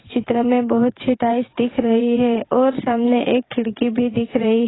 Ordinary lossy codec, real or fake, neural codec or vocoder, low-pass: AAC, 16 kbps; fake; vocoder, 22.05 kHz, 80 mel bands, WaveNeXt; 7.2 kHz